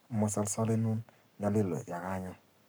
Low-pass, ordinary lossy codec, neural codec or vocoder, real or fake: none; none; codec, 44.1 kHz, 7.8 kbps, Pupu-Codec; fake